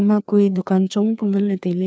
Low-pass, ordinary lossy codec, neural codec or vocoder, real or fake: none; none; codec, 16 kHz, 2 kbps, FreqCodec, larger model; fake